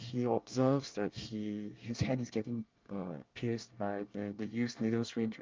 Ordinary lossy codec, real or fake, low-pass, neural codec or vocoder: Opus, 24 kbps; fake; 7.2 kHz; codec, 24 kHz, 1 kbps, SNAC